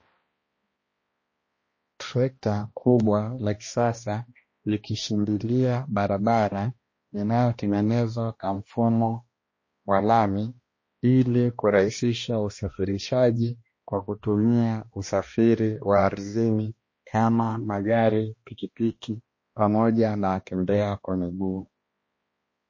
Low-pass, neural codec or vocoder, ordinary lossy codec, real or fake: 7.2 kHz; codec, 16 kHz, 1 kbps, X-Codec, HuBERT features, trained on balanced general audio; MP3, 32 kbps; fake